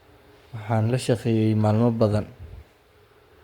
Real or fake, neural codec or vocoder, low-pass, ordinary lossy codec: fake; codec, 44.1 kHz, 7.8 kbps, Pupu-Codec; 19.8 kHz; Opus, 64 kbps